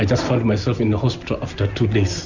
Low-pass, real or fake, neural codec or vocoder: 7.2 kHz; real; none